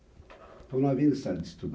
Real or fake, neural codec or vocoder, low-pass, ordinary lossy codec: real; none; none; none